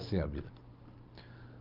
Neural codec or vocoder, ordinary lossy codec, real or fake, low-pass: none; Opus, 24 kbps; real; 5.4 kHz